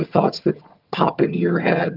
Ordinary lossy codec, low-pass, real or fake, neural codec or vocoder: Opus, 32 kbps; 5.4 kHz; fake; vocoder, 22.05 kHz, 80 mel bands, HiFi-GAN